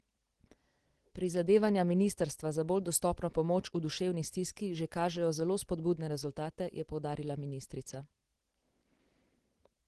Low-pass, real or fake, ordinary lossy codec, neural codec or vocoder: 10.8 kHz; real; Opus, 16 kbps; none